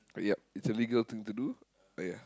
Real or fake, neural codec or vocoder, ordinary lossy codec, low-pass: real; none; none; none